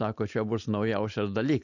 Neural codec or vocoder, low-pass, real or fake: none; 7.2 kHz; real